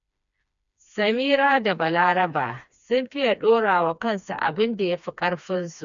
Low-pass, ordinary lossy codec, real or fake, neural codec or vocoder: 7.2 kHz; none; fake; codec, 16 kHz, 2 kbps, FreqCodec, smaller model